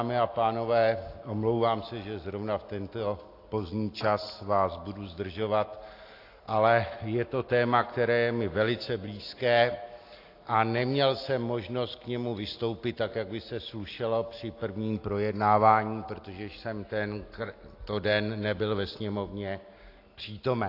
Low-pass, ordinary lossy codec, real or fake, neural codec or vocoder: 5.4 kHz; AAC, 32 kbps; real; none